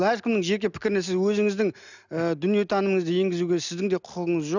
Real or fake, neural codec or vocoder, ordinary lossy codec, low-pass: real; none; none; 7.2 kHz